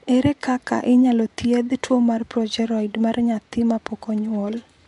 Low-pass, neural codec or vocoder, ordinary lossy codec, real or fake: 10.8 kHz; vocoder, 24 kHz, 100 mel bands, Vocos; none; fake